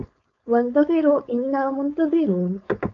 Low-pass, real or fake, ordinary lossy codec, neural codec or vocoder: 7.2 kHz; fake; MP3, 48 kbps; codec, 16 kHz, 4.8 kbps, FACodec